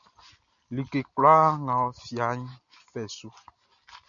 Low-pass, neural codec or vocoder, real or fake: 7.2 kHz; none; real